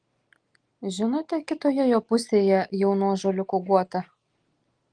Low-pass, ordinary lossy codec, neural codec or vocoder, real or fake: 9.9 kHz; Opus, 24 kbps; none; real